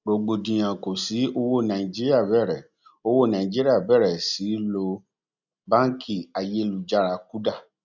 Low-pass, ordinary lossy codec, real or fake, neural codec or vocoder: 7.2 kHz; none; real; none